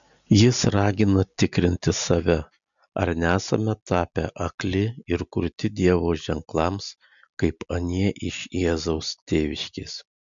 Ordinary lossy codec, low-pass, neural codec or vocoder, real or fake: MP3, 96 kbps; 7.2 kHz; none; real